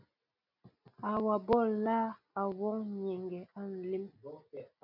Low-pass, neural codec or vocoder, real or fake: 5.4 kHz; none; real